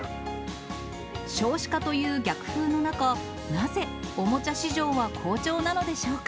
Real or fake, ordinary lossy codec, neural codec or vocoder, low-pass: real; none; none; none